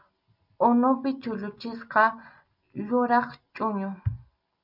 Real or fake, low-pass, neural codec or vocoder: real; 5.4 kHz; none